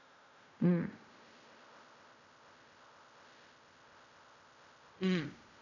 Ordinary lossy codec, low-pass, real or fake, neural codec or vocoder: none; 7.2 kHz; fake; codec, 16 kHz in and 24 kHz out, 0.4 kbps, LongCat-Audio-Codec, fine tuned four codebook decoder